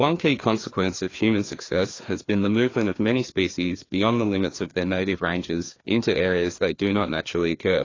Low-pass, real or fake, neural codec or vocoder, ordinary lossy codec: 7.2 kHz; fake; codec, 16 kHz, 2 kbps, FreqCodec, larger model; AAC, 32 kbps